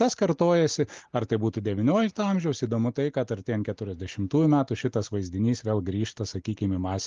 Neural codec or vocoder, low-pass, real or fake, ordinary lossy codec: none; 7.2 kHz; real; Opus, 32 kbps